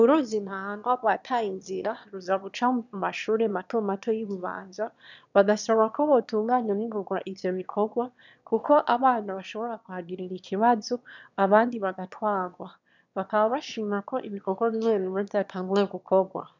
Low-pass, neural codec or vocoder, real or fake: 7.2 kHz; autoencoder, 22.05 kHz, a latent of 192 numbers a frame, VITS, trained on one speaker; fake